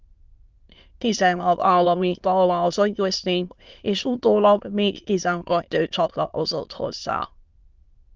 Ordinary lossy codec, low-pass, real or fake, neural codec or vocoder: Opus, 24 kbps; 7.2 kHz; fake; autoencoder, 22.05 kHz, a latent of 192 numbers a frame, VITS, trained on many speakers